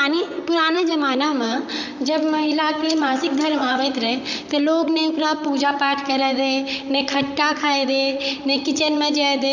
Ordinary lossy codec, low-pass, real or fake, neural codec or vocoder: none; 7.2 kHz; fake; vocoder, 44.1 kHz, 128 mel bands, Pupu-Vocoder